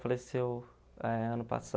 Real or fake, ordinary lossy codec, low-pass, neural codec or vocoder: real; none; none; none